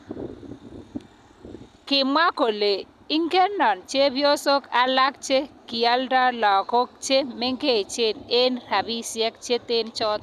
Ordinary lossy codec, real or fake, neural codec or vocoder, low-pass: none; real; none; 14.4 kHz